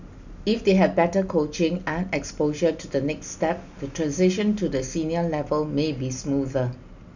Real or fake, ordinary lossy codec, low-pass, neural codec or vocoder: real; none; 7.2 kHz; none